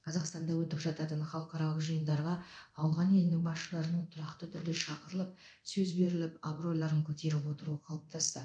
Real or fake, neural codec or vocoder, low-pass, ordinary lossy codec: fake; codec, 24 kHz, 0.9 kbps, DualCodec; 9.9 kHz; none